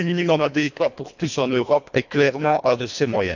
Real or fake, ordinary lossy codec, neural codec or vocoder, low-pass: fake; none; codec, 24 kHz, 1.5 kbps, HILCodec; 7.2 kHz